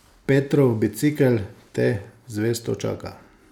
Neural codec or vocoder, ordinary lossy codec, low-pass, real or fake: none; none; 19.8 kHz; real